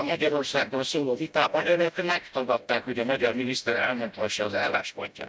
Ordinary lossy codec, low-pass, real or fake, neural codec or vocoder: none; none; fake; codec, 16 kHz, 0.5 kbps, FreqCodec, smaller model